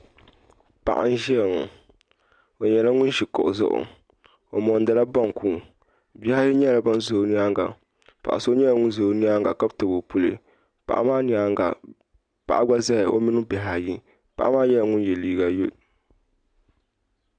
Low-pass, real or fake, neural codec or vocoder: 9.9 kHz; real; none